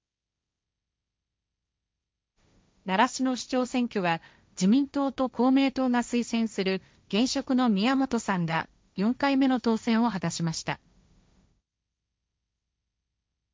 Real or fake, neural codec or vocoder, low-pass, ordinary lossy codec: fake; codec, 16 kHz, 1.1 kbps, Voila-Tokenizer; none; none